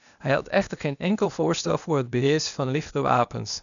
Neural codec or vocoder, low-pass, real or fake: codec, 16 kHz, 0.8 kbps, ZipCodec; 7.2 kHz; fake